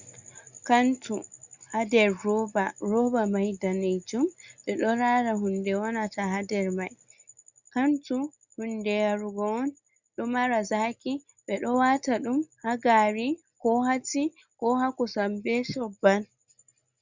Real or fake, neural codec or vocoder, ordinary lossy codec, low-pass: real; none; Opus, 64 kbps; 7.2 kHz